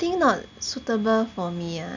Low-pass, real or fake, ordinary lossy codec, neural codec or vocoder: 7.2 kHz; real; none; none